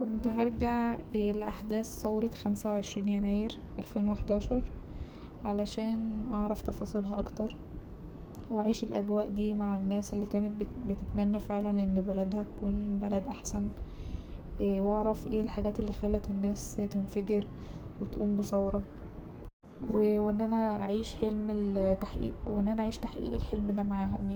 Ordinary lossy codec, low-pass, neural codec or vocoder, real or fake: none; none; codec, 44.1 kHz, 2.6 kbps, SNAC; fake